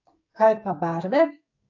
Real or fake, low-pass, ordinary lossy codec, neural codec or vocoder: fake; 7.2 kHz; AAC, 48 kbps; codec, 44.1 kHz, 2.6 kbps, SNAC